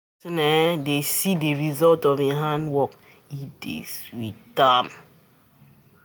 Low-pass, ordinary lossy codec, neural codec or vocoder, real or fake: none; none; none; real